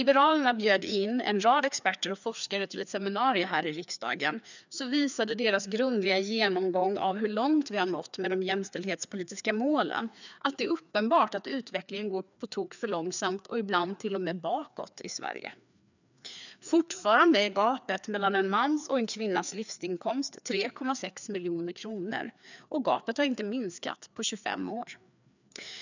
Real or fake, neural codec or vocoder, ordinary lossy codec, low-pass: fake; codec, 16 kHz, 2 kbps, FreqCodec, larger model; none; 7.2 kHz